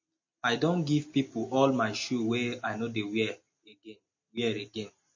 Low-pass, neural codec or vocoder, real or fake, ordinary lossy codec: 7.2 kHz; none; real; MP3, 32 kbps